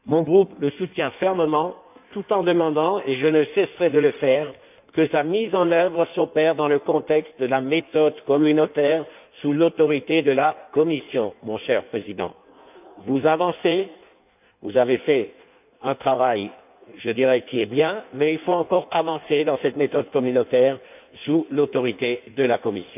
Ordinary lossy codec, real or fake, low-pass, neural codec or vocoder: none; fake; 3.6 kHz; codec, 16 kHz in and 24 kHz out, 1.1 kbps, FireRedTTS-2 codec